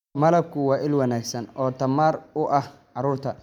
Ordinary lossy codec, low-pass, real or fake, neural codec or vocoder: none; 19.8 kHz; real; none